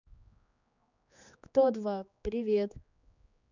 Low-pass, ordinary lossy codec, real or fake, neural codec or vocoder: 7.2 kHz; none; fake; codec, 16 kHz, 2 kbps, X-Codec, HuBERT features, trained on general audio